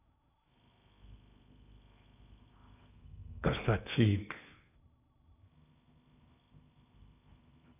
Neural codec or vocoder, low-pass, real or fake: codec, 16 kHz in and 24 kHz out, 0.8 kbps, FocalCodec, streaming, 65536 codes; 3.6 kHz; fake